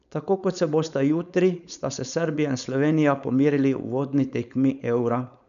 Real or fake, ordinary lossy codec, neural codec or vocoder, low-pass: fake; none; codec, 16 kHz, 4.8 kbps, FACodec; 7.2 kHz